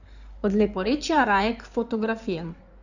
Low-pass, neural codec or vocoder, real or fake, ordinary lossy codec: 7.2 kHz; codec, 16 kHz in and 24 kHz out, 2.2 kbps, FireRedTTS-2 codec; fake; none